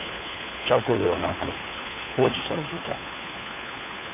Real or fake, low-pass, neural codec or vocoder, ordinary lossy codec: fake; 3.6 kHz; codec, 16 kHz, 4 kbps, FunCodec, trained on LibriTTS, 50 frames a second; MP3, 24 kbps